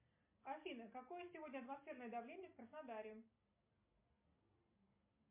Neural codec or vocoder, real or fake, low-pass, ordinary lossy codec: none; real; 3.6 kHz; MP3, 24 kbps